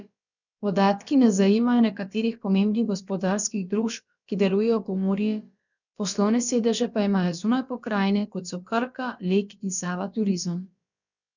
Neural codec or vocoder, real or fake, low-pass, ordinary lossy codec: codec, 16 kHz, about 1 kbps, DyCAST, with the encoder's durations; fake; 7.2 kHz; none